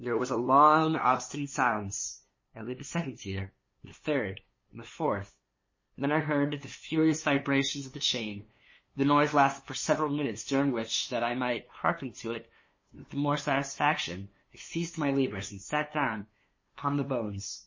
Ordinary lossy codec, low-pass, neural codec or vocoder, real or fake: MP3, 32 kbps; 7.2 kHz; codec, 16 kHz, 2 kbps, FunCodec, trained on LibriTTS, 25 frames a second; fake